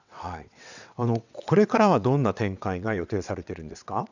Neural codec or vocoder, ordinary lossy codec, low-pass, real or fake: vocoder, 22.05 kHz, 80 mel bands, WaveNeXt; none; 7.2 kHz; fake